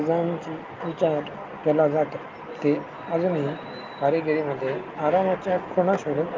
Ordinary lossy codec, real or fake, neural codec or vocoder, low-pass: Opus, 24 kbps; real; none; 7.2 kHz